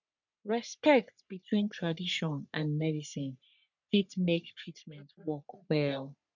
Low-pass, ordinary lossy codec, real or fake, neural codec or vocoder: 7.2 kHz; none; fake; codec, 44.1 kHz, 3.4 kbps, Pupu-Codec